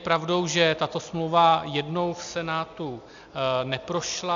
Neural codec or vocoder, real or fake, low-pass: none; real; 7.2 kHz